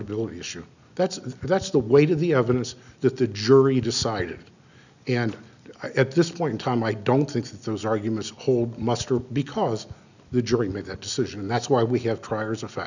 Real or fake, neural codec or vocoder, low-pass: real; none; 7.2 kHz